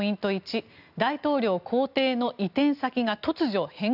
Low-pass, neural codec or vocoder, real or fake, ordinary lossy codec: 5.4 kHz; none; real; none